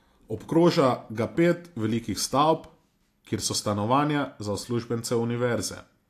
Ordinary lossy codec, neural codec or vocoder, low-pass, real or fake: AAC, 64 kbps; none; 14.4 kHz; real